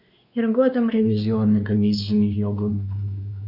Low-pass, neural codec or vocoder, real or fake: 5.4 kHz; codec, 16 kHz, 2 kbps, X-Codec, WavLM features, trained on Multilingual LibriSpeech; fake